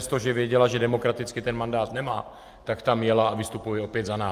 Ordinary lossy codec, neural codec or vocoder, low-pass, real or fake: Opus, 32 kbps; none; 14.4 kHz; real